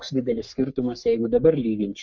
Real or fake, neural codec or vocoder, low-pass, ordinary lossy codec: fake; codec, 44.1 kHz, 3.4 kbps, Pupu-Codec; 7.2 kHz; MP3, 48 kbps